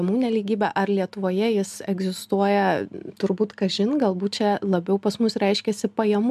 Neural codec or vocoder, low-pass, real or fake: none; 14.4 kHz; real